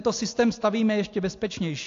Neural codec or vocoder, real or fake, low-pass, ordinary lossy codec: none; real; 7.2 kHz; AAC, 48 kbps